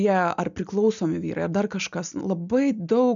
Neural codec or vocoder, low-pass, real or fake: none; 7.2 kHz; real